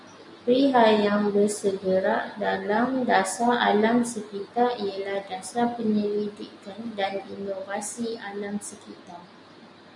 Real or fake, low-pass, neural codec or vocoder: real; 10.8 kHz; none